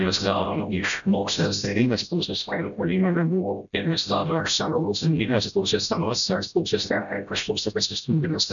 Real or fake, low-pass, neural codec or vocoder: fake; 7.2 kHz; codec, 16 kHz, 0.5 kbps, FreqCodec, smaller model